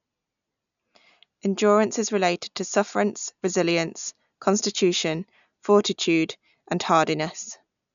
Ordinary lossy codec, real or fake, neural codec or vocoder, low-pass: none; real; none; 7.2 kHz